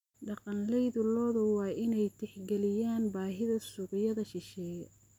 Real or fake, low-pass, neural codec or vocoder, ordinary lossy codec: real; 19.8 kHz; none; none